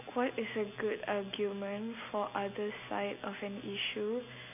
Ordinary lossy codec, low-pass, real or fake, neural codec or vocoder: none; 3.6 kHz; real; none